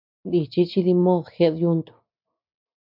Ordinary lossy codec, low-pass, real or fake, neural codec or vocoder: MP3, 48 kbps; 5.4 kHz; real; none